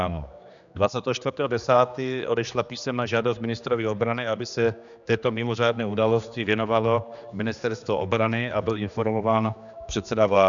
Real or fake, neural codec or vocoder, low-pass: fake; codec, 16 kHz, 2 kbps, X-Codec, HuBERT features, trained on general audio; 7.2 kHz